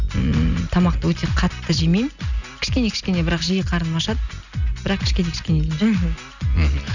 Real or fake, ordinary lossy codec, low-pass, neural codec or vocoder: real; none; 7.2 kHz; none